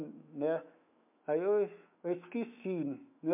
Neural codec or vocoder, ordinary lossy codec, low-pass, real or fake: autoencoder, 48 kHz, 128 numbers a frame, DAC-VAE, trained on Japanese speech; MP3, 32 kbps; 3.6 kHz; fake